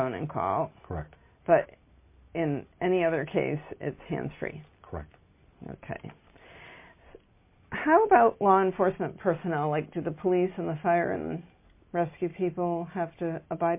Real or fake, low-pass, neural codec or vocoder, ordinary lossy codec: real; 3.6 kHz; none; MP3, 24 kbps